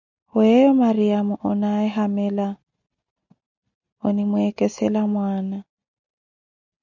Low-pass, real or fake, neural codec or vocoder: 7.2 kHz; real; none